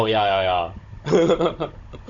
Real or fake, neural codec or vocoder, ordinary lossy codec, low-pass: fake; codec, 16 kHz, 16 kbps, FunCodec, trained on Chinese and English, 50 frames a second; none; 7.2 kHz